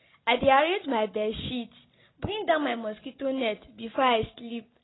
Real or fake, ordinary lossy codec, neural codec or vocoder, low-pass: real; AAC, 16 kbps; none; 7.2 kHz